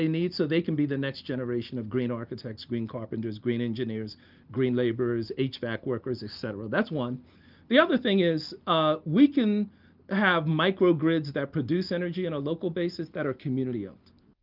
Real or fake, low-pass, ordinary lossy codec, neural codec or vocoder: real; 5.4 kHz; Opus, 32 kbps; none